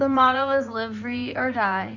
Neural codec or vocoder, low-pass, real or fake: codec, 16 kHz in and 24 kHz out, 2.2 kbps, FireRedTTS-2 codec; 7.2 kHz; fake